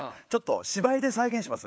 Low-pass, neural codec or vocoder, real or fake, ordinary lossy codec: none; codec, 16 kHz, 4 kbps, FunCodec, trained on LibriTTS, 50 frames a second; fake; none